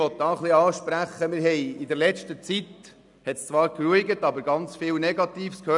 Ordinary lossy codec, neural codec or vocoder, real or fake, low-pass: none; none; real; 10.8 kHz